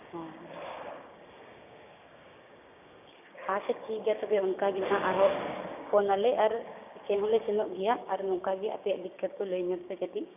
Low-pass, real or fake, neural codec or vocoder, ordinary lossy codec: 3.6 kHz; fake; codec, 16 kHz, 6 kbps, DAC; none